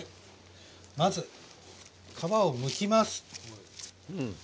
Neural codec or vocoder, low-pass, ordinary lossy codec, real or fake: none; none; none; real